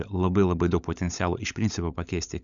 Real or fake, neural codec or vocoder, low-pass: fake; codec, 16 kHz, 16 kbps, FunCodec, trained on LibriTTS, 50 frames a second; 7.2 kHz